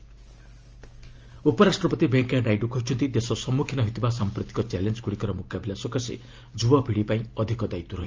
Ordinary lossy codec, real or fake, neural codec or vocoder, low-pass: Opus, 24 kbps; real; none; 7.2 kHz